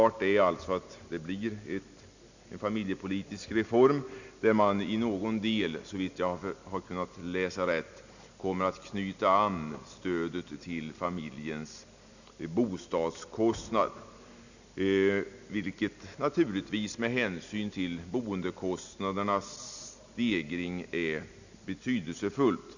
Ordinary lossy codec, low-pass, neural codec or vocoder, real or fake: none; 7.2 kHz; none; real